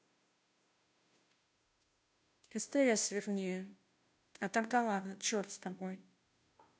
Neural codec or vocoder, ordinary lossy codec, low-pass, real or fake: codec, 16 kHz, 0.5 kbps, FunCodec, trained on Chinese and English, 25 frames a second; none; none; fake